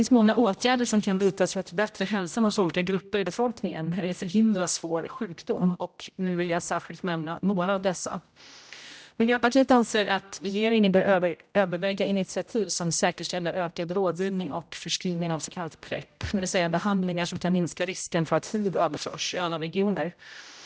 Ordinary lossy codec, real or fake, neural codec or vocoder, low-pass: none; fake; codec, 16 kHz, 0.5 kbps, X-Codec, HuBERT features, trained on general audio; none